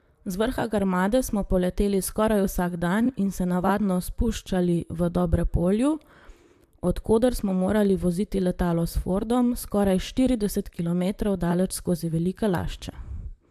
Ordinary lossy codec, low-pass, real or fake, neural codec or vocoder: AAC, 96 kbps; 14.4 kHz; fake; vocoder, 44.1 kHz, 128 mel bands, Pupu-Vocoder